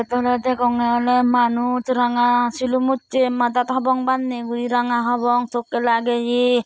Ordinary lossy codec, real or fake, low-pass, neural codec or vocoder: none; real; none; none